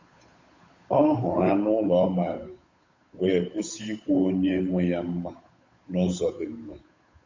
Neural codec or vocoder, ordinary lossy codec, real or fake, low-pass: codec, 16 kHz, 8 kbps, FunCodec, trained on Chinese and English, 25 frames a second; MP3, 32 kbps; fake; 7.2 kHz